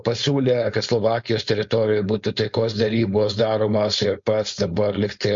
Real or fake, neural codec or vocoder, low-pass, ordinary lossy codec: fake; codec, 16 kHz, 4.8 kbps, FACodec; 7.2 kHz; MP3, 48 kbps